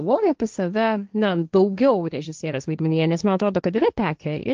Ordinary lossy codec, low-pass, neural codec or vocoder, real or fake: Opus, 24 kbps; 7.2 kHz; codec, 16 kHz, 1.1 kbps, Voila-Tokenizer; fake